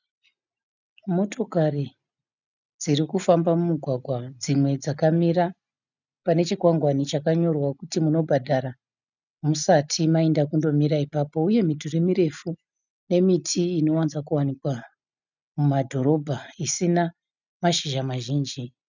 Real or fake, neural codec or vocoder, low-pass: real; none; 7.2 kHz